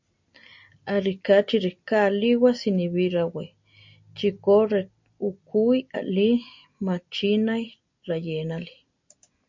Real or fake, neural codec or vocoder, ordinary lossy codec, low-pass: real; none; MP3, 48 kbps; 7.2 kHz